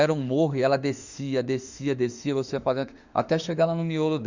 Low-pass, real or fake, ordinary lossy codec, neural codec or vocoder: 7.2 kHz; fake; Opus, 64 kbps; autoencoder, 48 kHz, 32 numbers a frame, DAC-VAE, trained on Japanese speech